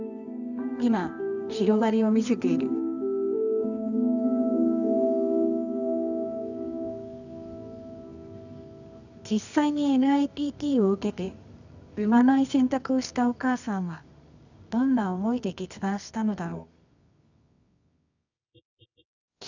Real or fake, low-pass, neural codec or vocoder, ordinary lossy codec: fake; 7.2 kHz; codec, 24 kHz, 0.9 kbps, WavTokenizer, medium music audio release; none